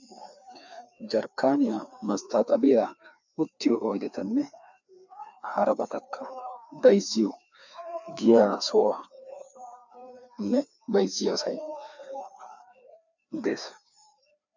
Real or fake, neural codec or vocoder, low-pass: fake; codec, 16 kHz, 2 kbps, FreqCodec, larger model; 7.2 kHz